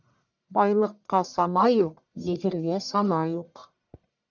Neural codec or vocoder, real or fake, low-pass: codec, 44.1 kHz, 1.7 kbps, Pupu-Codec; fake; 7.2 kHz